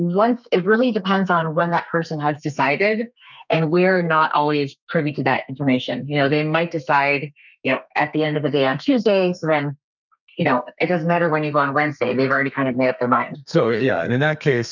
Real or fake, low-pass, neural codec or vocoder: fake; 7.2 kHz; codec, 32 kHz, 1.9 kbps, SNAC